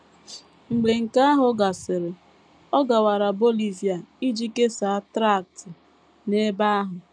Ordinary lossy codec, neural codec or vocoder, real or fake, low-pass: none; none; real; 9.9 kHz